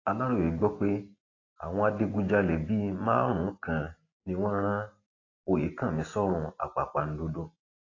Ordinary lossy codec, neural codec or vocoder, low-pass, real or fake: AAC, 32 kbps; none; 7.2 kHz; real